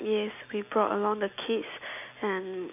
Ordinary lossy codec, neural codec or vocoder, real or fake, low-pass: none; none; real; 3.6 kHz